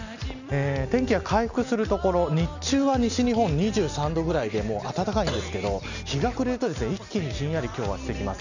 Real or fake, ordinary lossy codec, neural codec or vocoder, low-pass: real; none; none; 7.2 kHz